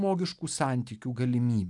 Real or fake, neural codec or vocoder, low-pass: real; none; 10.8 kHz